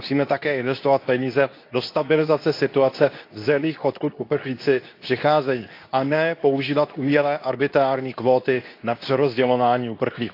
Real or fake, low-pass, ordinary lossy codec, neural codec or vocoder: fake; 5.4 kHz; AAC, 32 kbps; codec, 24 kHz, 0.9 kbps, WavTokenizer, medium speech release version 2